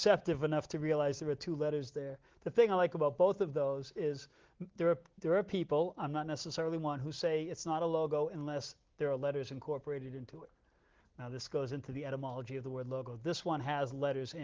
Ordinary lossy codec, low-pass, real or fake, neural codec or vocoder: Opus, 16 kbps; 7.2 kHz; real; none